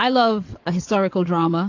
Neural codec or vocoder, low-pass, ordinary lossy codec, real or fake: vocoder, 22.05 kHz, 80 mel bands, WaveNeXt; 7.2 kHz; AAC, 48 kbps; fake